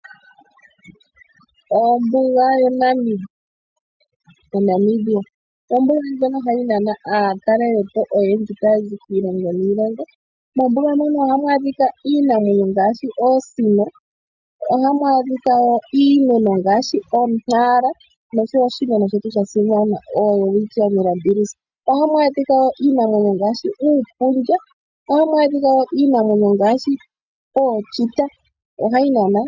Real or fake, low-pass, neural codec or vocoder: real; 7.2 kHz; none